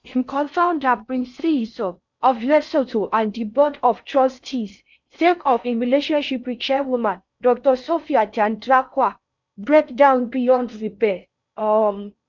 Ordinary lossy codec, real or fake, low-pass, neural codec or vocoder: MP3, 64 kbps; fake; 7.2 kHz; codec, 16 kHz in and 24 kHz out, 0.6 kbps, FocalCodec, streaming, 4096 codes